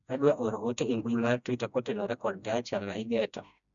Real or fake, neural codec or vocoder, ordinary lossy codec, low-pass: fake; codec, 16 kHz, 1 kbps, FreqCodec, smaller model; none; 7.2 kHz